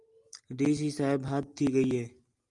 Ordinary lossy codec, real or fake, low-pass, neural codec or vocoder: Opus, 32 kbps; real; 10.8 kHz; none